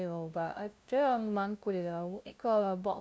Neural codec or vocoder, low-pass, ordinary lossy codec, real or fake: codec, 16 kHz, 0.5 kbps, FunCodec, trained on LibriTTS, 25 frames a second; none; none; fake